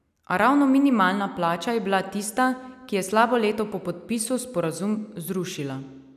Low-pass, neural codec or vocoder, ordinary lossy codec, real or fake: 14.4 kHz; none; none; real